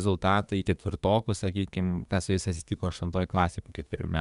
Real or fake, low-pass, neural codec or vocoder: fake; 10.8 kHz; codec, 24 kHz, 1 kbps, SNAC